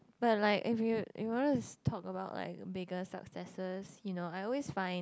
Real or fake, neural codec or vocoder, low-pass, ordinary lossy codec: real; none; none; none